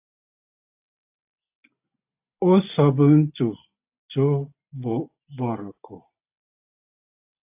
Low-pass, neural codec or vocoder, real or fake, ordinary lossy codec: 3.6 kHz; none; real; AAC, 32 kbps